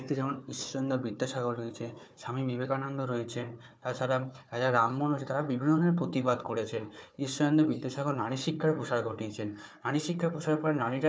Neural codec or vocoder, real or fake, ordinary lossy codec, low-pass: codec, 16 kHz, 4 kbps, FunCodec, trained on Chinese and English, 50 frames a second; fake; none; none